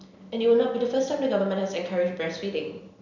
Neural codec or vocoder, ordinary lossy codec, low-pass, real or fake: none; none; 7.2 kHz; real